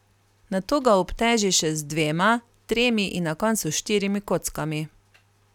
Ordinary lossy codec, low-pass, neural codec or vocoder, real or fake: none; 19.8 kHz; none; real